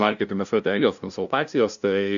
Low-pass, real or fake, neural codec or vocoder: 7.2 kHz; fake; codec, 16 kHz, 0.5 kbps, FunCodec, trained on LibriTTS, 25 frames a second